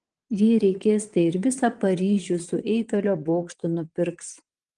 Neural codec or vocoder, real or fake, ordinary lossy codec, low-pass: vocoder, 22.05 kHz, 80 mel bands, WaveNeXt; fake; Opus, 24 kbps; 9.9 kHz